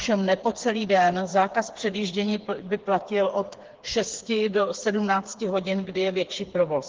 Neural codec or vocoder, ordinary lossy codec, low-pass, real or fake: codec, 16 kHz, 4 kbps, FreqCodec, smaller model; Opus, 16 kbps; 7.2 kHz; fake